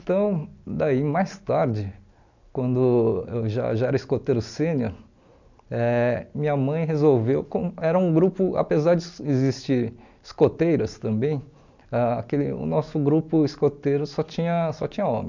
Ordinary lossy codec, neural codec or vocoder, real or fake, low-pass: none; none; real; 7.2 kHz